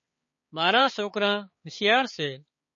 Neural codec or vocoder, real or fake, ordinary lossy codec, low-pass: codec, 16 kHz, 4 kbps, X-Codec, HuBERT features, trained on balanced general audio; fake; MP3, 32 kbps; 7.2 kHz